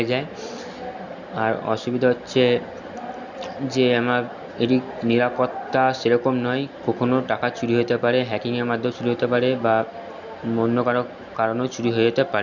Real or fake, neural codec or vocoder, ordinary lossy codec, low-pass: real; none; none; 7.2 kHz